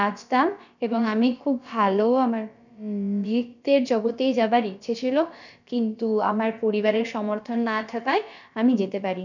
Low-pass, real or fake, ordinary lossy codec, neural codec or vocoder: 7.2 kHz; fake; none; codec, 16 kHz, about 1 kbps, DyCAST, with the encoder's durations